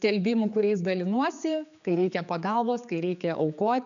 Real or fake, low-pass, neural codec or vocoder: fake; 7.2 kHz; codec, 16 kHz, 4 kbps, X-Codec, HuBERT features, trained on general audio